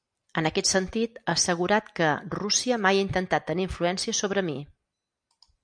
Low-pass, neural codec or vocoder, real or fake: 9.9 kHz; none; real